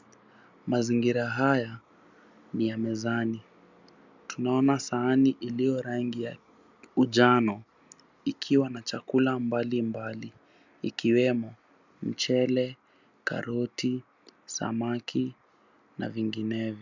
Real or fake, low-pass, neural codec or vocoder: real; 7.2 kHz; none